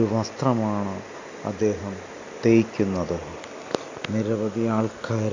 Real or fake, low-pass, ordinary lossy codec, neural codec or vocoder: real; 7.2 kHz; AAC, 48 kbps; none